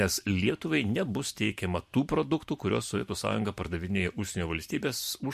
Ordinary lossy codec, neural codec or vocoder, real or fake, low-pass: MP3, 64 kbps; vocoder, 44.1 kHz, 128 mel bands every 512 samples, BigVGAN v2; fake; 14.4 kHz